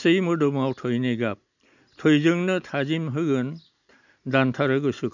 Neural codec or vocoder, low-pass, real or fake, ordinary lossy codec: none; 7.2 kHz; real; none